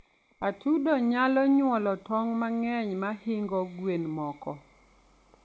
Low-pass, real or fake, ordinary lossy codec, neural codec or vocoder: none; real; none; none